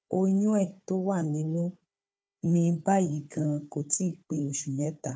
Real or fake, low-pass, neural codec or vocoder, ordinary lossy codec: fake; none; codec, 16 kHz, 4 kbps, FunCodec, trained on Chinese and English, 50 frames a second; none